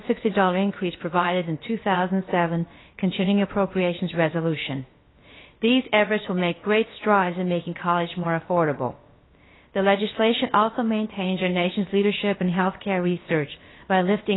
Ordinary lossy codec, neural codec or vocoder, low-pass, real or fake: AAC, 16 kbps; codec, 16 kHz, about 1 kbps, DyCAST, with the encoder's durations; 7.2 kHz; fake